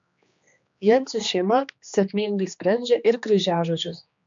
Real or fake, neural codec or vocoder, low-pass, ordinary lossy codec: fake; codec, 16 kHz, 2 kbps, X-Codec, HuBERT features, trained on general audio; 7.2 kHz; MP3, 64 kbps